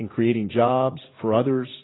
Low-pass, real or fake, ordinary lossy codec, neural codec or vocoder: 7.2 kHz; fake; AAC, 16 kbps; vocoder, 44.1 kHz, 80 mel bands, Vocos